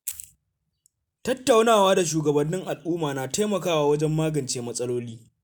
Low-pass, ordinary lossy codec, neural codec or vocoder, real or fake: none; none; none; real